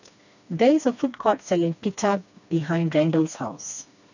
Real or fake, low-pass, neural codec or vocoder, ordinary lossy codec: fake; 7.2 kHz; codec, 16 kHz, 2 kbps, FreqCodec, smaller model; none